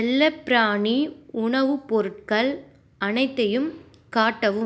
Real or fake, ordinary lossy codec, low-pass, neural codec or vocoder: real; none; none; none